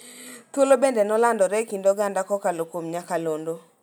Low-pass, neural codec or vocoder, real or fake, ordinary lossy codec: none; none; real; none